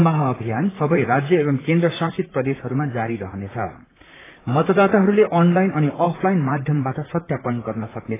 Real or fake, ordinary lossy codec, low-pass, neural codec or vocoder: fake; AAC, 16 kbps; 3.6 kHz; vocoder, 44.1 kHz, 128 mel bands, Pupu-Vocoder